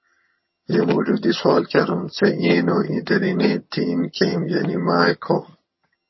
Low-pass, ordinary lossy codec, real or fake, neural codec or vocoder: 7.2 kHz; MP3, 24 kbps; fake; vocoder, 22.05 kHz, 80 mel bands, HiFi-GAN